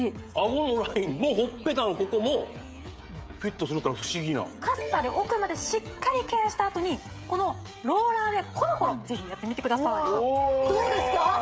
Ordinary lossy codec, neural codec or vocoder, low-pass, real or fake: none; codec, 16 kHz, 16 kbps, FreqCodec, smaller model; none; fake